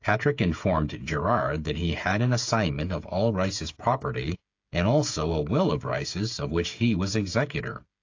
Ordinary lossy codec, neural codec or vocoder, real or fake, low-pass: AAC, 48 kbps; codec, 16 kHz, 8 kbps, FreqCodec, smaller model; fake; 7.2 kHz